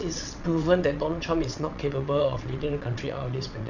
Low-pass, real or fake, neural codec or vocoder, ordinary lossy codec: 7.2 kHz; fake; vocoder, 22.05 kHz, 80 mel bands, WaveNeXt; none